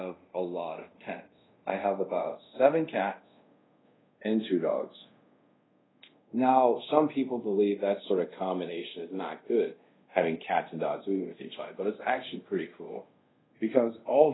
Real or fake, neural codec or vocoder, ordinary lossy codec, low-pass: fake; codec, 24 kHz, 0.5 kbps, DualCodec; AAC, 16 kbps; 7.2 kHz